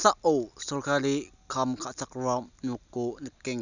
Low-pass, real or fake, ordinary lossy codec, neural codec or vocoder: 7.2 kHz; real; none; none